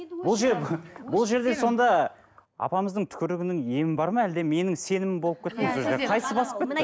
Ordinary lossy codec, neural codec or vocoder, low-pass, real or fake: none; none; none; real